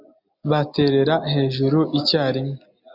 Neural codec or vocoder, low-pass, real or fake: none; 5.4 kHz; real